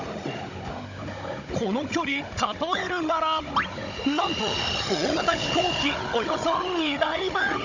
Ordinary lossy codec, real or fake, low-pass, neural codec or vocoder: none; fake; 7.2 kHz; codec, 16 kHz, 16 kbps, FunCodec, trained on Chinese and English, 50 frames a second